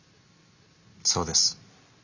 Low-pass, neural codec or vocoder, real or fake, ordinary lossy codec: 7.2 kHz; none; real; Opus, 64 kbps